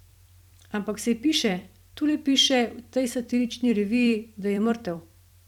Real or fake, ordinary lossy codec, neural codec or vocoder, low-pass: fake; none; vocoder, 44.1 kHz, 128 mel bands every 256 samples, BigVGAN v2; 19.8 kHz